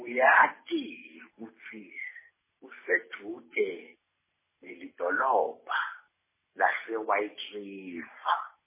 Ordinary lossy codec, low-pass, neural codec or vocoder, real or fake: MP3, 16 kbps; 3.6 kHz; none; real